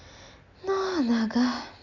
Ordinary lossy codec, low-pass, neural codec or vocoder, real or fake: none; 7.2 kHz; none; real